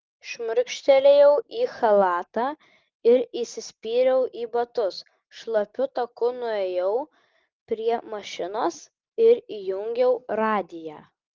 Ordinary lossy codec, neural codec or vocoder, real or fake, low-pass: Opus, 32 kbps; none; real; 7.2 kHz